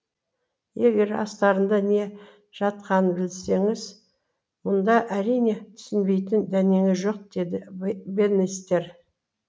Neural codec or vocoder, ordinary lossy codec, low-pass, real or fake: none; none; none; real